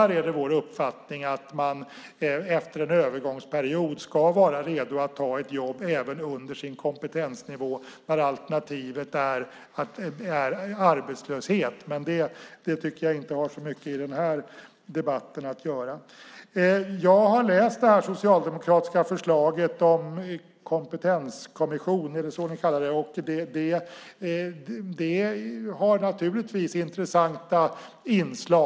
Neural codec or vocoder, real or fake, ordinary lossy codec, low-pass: none; real; none; none